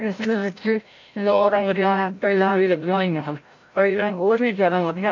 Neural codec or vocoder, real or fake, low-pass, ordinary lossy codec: codec, 16 kHz, 0.5 kbps, FreqCodec, larger model; fake; 7.2 kHz; AAC, 48 kbps